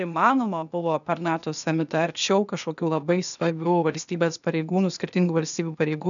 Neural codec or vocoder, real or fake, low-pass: codec, 16 kHz, 0.8 kbps, ZipCodec; fake; 7.2 kHz